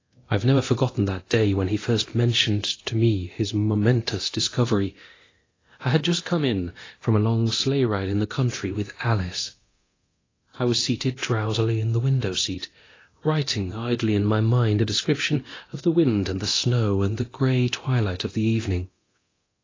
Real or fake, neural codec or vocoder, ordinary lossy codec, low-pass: fake; codec, 24 kHz, 0.9 kbps, DualCodec; AAC, 32 kbps; 7.2 kHz